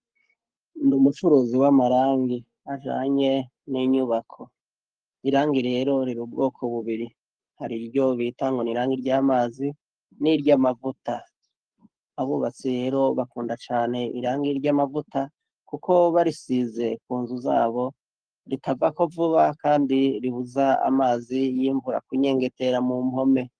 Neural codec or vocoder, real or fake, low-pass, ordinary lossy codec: codec, 44.1 kHz, 7.8 kbps, Pupu-Codec; fake; 9.9 kHz; Opus, 24 kbps